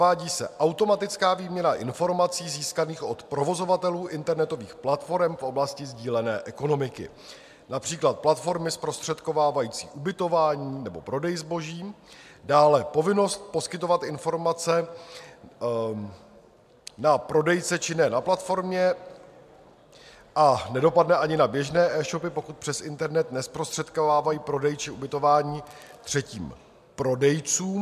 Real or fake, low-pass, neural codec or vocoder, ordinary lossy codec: real; 14.4 kHz; none; MP3, 96 kbps